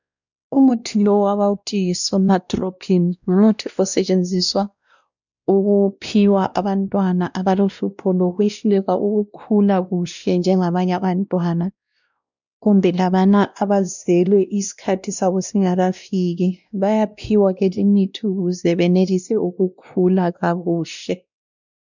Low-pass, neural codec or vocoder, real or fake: 7.2 kHz; codec, 16 kHz, 1 kbps, X-Codec, WavLM features, trained on Multilingual LibriSpeech; fake